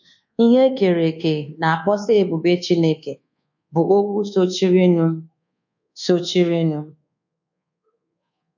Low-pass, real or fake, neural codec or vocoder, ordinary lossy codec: 7.2 kHz; fake; codec, 24 kHz, 1.2 kbps, DualCodec; none